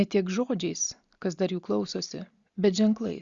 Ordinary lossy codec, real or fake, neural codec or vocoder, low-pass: Opus, 64 kbps; real; none; 7.2 kHz